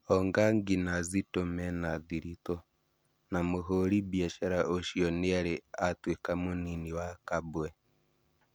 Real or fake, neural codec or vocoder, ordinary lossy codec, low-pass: real; none; none; none